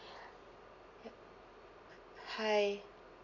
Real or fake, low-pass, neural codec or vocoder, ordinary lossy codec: real; 7.2 kHz; none; none